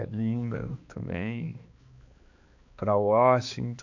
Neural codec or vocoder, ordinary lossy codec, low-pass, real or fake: codec, 16 kHz, 2 kbps, X-Codec, HuBERT features, trained on balanced general audio; AAC, 48 kbps; 7.2 kHz; fake